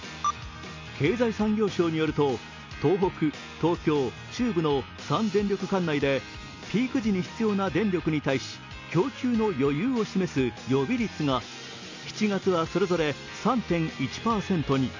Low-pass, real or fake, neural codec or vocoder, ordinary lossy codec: 7.2 kHz; real; none; MP3, 64 kbps